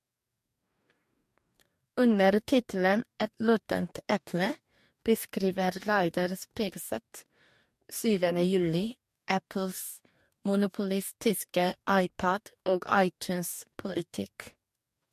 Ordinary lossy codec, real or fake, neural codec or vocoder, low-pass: MP3, 64 kbps; fake; codec, 44.1 kHz, 2.6 kbps, DAC; 14.4 kHz